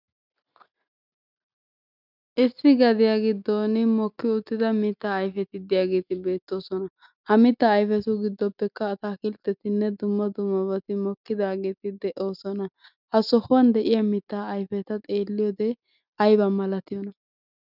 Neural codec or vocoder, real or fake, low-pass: none; real; 5.4 kHz